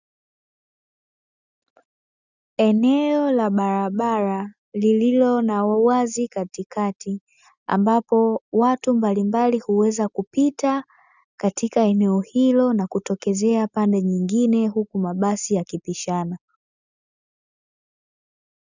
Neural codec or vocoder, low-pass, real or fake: none; 7.2 kHz; real